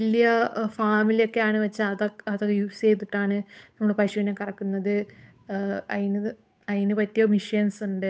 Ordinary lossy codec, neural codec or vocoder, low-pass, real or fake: none; codec, 16 kHz, 8 kbps, FunCodec, trained on Chinese and English, 25 frames a second; none; fake